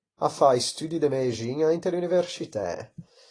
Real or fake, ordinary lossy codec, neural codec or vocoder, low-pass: real; AAC, 32 kbps; none; 9.9 kHz